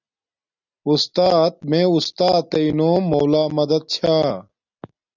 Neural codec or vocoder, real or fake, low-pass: none; real; 7.2 kHz